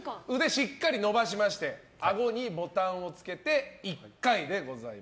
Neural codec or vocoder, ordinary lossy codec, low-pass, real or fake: none; none; none; real